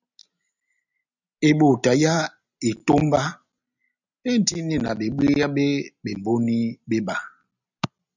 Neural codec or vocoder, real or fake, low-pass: none; real; 7.2 kHz